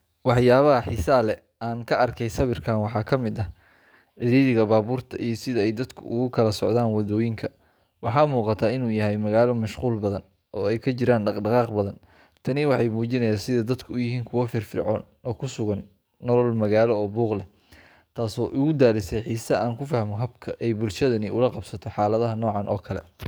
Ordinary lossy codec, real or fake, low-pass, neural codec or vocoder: none; fake; none; codec, 44.1 kHz, 7.8 kbps, DAC